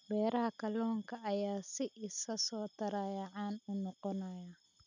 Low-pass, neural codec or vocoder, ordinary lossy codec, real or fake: 7.2 kHz; none; none; real